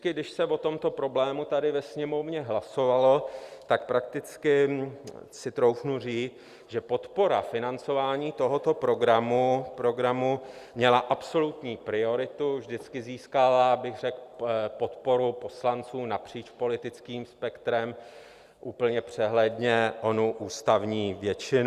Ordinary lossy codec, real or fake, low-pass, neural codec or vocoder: Opus, 64 kbps; real; 14.4 kHz; none